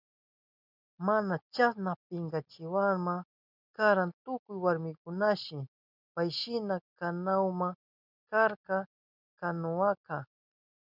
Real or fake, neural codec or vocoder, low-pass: real; none; 5.4 kHz